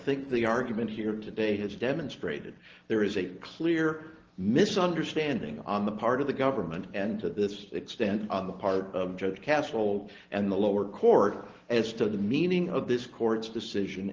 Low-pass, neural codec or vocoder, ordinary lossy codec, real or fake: 7.2 kHz; none; Opus, 16 kbps; real